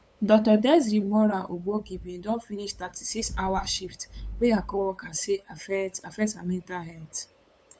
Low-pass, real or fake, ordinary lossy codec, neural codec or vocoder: none; fake; none; codec, 16 kHz, 8 kbps, FunCodec, trained on LibriTTS, 25 frames a second